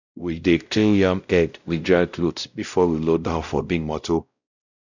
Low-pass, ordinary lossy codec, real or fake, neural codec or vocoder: 7.2 kHz; none; fake; codec, 16 kHz, 0.5 kbps, X-Codec, WavLM features, trained on Multilingual LibriSpeech